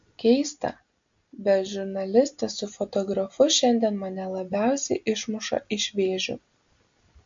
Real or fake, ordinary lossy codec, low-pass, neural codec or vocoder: real; MP3, 48 kbps; 7.2 kHz; none